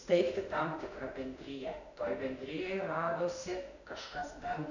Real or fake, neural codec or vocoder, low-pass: fake; autoencoder, 48 kHz, 32 numbers a frame, DAC-VAE, trained on Japanese speech; 7.2 kHz